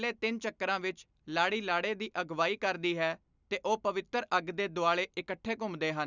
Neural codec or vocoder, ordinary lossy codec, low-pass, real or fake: none; none; 7.2 kHz; real